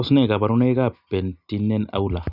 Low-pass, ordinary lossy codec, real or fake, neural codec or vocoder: 5.4 kHz; none; real; none